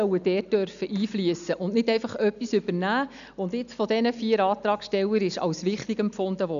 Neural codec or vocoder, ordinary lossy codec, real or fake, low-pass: none; none; real; 7.2 kHz